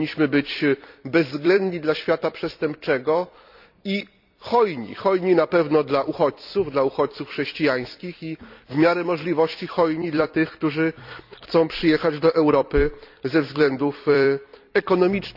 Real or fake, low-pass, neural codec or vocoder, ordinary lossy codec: fake; 5.4 kHz; vocoder, 44.1 kHz, 128 mel bands every 256 samples, BigVGAN v2; none